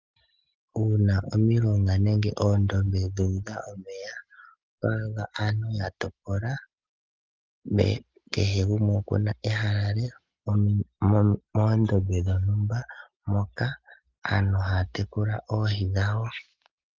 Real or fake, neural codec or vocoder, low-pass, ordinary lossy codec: real; none; 7.2 kHz; Opus, 32 kbps